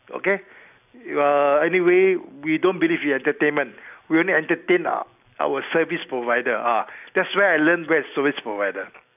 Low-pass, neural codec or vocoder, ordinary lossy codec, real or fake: 3.6 kHz; none; none; real